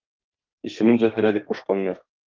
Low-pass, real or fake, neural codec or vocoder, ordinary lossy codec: 7.2 kHz; fake; codec, 32 kHz, 1.9 kbps, SNAC; Opus, 24 kbps